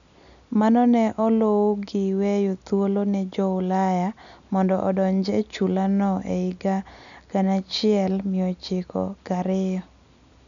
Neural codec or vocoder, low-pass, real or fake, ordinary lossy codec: none; 7.2 kHz; real; none